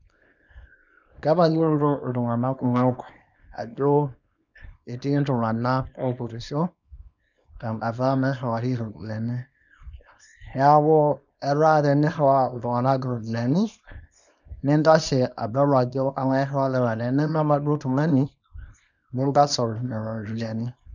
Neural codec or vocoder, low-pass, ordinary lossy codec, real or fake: codec, 24 kHz, 0.9 kbps, WavTokenizer, small release; 7.2 kHz; none; fake